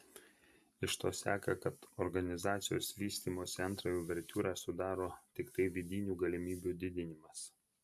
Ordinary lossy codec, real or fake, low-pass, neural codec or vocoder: AAC, 96 kbps; real; 14.4 kHz; none